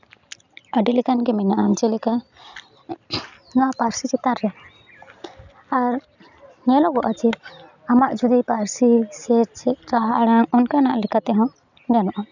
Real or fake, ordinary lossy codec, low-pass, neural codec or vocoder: real; none; 7.2 kHz; none